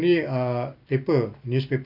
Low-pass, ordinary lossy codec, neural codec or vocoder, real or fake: 5.4 kHz; none; vocoder, 44.1 kHz, 128 mel bands every 256 samples, BigVGAN v2; fake